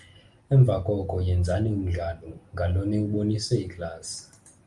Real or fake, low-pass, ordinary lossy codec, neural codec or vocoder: real; 10.8 kHz; Opus, 32 kbps; none